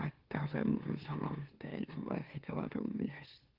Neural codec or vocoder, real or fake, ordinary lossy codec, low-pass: autoencoder, 44.1 kHz, a latent of 192 numbers a frame, MeloTTS; fake; Opus, 16 kbps; 5.4 kHz